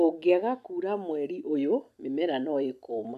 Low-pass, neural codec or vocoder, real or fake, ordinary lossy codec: 14.4 kHz; none; real; none